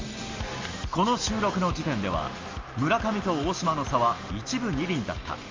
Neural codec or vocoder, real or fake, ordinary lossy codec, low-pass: none; real; Opus, 32 kbps; 7.2 kHz